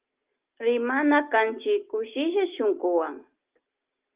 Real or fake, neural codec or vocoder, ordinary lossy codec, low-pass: real; none; Opus, 32 kbps; 3.6 kHz